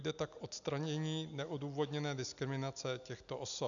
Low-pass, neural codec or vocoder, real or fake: 7.2 kHz; none; real